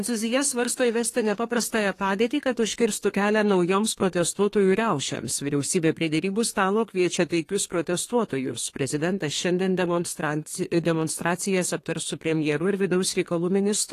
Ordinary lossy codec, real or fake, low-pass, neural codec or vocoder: AAC, 48 kbps; fake; 14.4 kHz; codec, 32 kHz, 1.9 kbps, SNAC